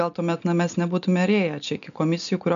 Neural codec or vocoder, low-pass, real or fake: none; 7.2 kHz; real